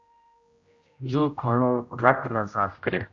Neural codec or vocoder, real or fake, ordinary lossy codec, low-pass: codec, 16 kHz, 0.5 kbps, X-Codec, HuBERT features, trained on general audio; fake; Opus, 64 kbps; 7.2 kHz